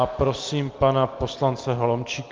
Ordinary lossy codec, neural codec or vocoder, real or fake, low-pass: Opus, 24 kbps; none; real; 7.2 kHz